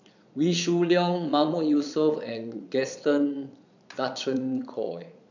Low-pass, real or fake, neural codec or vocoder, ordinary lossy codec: 7.2 kHz; fake; vocoder, 22.05 kHz, 80 mel bands, Vocos; none